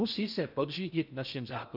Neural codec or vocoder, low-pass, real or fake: codec, 16 kHz in and 24 kHz out, 0.6 kbps, FocalCodec, streaming, 4096 codes; 5.4 kHz; fake